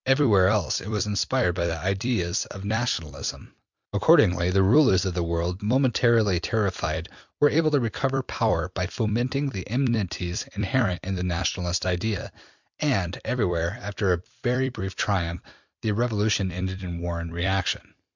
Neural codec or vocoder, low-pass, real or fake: vocoder, 44.1 kHz, 128 mel bands every 256 samples, BigVGAN v2; 7.2 kHz; fake